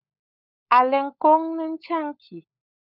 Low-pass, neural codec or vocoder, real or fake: 5.4 kHz; codec, 16 kHz, 16 kbps, FunCodec, trained on LibriTTS, 50 frames a second; fake